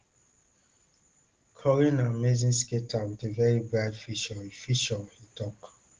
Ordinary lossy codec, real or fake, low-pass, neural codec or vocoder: Opus, 16 kbps; real; 7.2 kHz; none